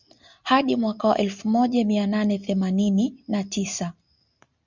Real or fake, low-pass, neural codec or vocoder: real; 7.2 kHz; none